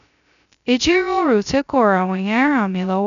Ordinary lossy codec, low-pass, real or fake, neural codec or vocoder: none; 7.2 kHz; fake; codec, 16 kHz, 0.2 kbps, FocalCodec